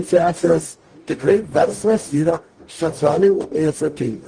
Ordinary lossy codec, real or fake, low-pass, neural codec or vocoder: Opus, 24 kbps; fake; 9.9 kHz; codec, 44.1 kHz, 0.9 kbps, DAC